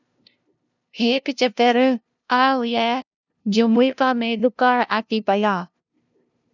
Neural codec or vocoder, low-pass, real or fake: codec, 16 kHz, 0.5 kbps, FunCodec, trained on LibriTTS, 25 frames a second; 7.2 kHz; fake